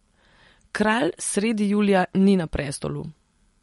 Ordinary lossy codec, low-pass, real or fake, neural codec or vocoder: MP3, 48 kbps; 19.8 kHz; real; none